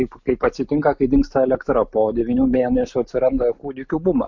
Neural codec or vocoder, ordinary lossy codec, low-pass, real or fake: none; MP3, 48 kbps; 7.2 kHz; real